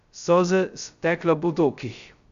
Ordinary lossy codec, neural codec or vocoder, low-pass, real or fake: Opus, 64 kbps; codec, 16 kHz, 0.2 kbps, FocalCodec; 7.2 kHz; fake